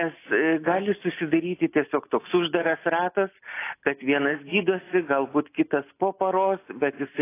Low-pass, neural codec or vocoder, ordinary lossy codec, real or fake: 3.6 kHz; none; AAC, 24 kbps; real